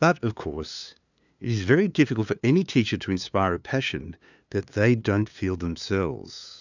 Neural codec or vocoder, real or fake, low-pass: codec, 16 kHz, 2 kbps, FunCodec, trained on LibriTTS, 25 frames a second; fake; 7.2 kHz